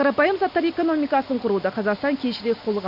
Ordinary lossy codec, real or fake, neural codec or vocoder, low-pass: none; real; none; 5.4 kHz